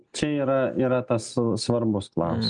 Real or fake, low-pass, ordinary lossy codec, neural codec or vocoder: real; 9.9 kHz; MP3, 96 kbps; none